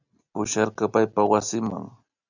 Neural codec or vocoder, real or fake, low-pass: none; real; 7.2 kHz